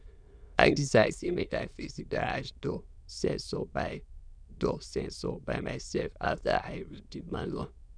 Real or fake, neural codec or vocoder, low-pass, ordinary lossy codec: fake; autoencoder, 22.05 kHz, a latent of 192 numbers a frame, VITS, trained on many speakers; 9.9 kHz; none